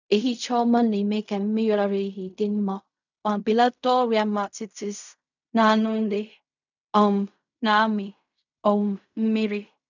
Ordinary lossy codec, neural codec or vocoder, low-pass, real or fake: none; codec, 16 kHz in and 24 kHz out, 0.4 kbps, LongCat-Audio-Codec, fine tuned four codebook decoder; 7.2 kHz; fake